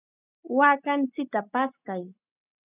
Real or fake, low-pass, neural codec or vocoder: real; 3.6 kHz; none